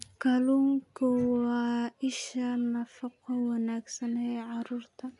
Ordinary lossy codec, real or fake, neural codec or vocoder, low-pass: none; real; none; 10.8 kHz